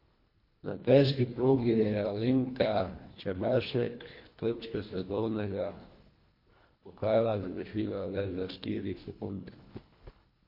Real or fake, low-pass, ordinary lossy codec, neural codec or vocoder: fake; 5.4 kHz; MP3, 32 kbps; codec, 24 kHz, 1.5 kbps, HILCodec